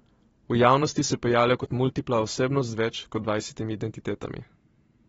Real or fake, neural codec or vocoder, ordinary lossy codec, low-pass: fake; vocoder, 44.1 kHz, 128 mel bands every 512 samples, BigVGAN v2; AAC, 24 kbps; 19.8 kHz